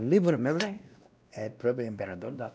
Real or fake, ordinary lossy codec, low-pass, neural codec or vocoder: fake; none; none; codec, 16 kHz, 1 kbps, X-Codec, WavLM features, trained on Multilingual LibriSpeech